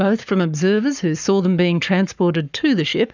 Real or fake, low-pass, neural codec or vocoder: fake; 7.2 kHz; codec, 44.1 kHz, 7.8 kbps, Pupu-Codec